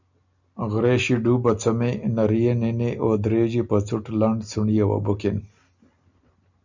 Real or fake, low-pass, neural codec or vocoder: real; 7.2 kHz; none